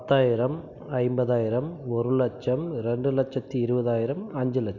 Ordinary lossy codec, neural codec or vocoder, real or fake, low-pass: none; none; real; 7.2 kHz